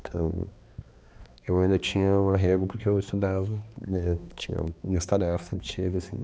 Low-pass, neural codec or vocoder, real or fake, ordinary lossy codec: none; codec, 16 kHz, 2 kbps, X-Codec, HuBERT features, trained on balanced general audio; fake; none